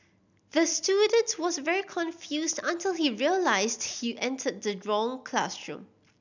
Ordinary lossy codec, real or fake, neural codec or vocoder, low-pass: none; real; none; 7.2 kHz